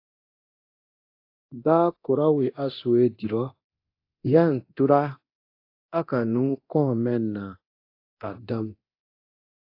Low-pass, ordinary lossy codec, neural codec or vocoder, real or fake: 5.4 kHz; AAC, 32 kbps; codec, 24 kHz, 0.9 kbps, DualCodec; fake